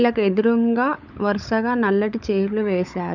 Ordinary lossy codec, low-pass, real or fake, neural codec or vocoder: none; 7.2 kHz; fake; codec, 16 kHz, 16 kbps, FunCodec, trained on LibriTTS, 50 frames a second